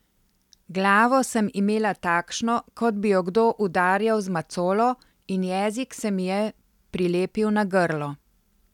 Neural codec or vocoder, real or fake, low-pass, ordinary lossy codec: none; real; 19.8 kHz; none